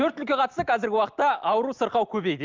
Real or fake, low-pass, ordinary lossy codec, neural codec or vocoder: real; 7.2 kHz; Opus, 24 kbps; none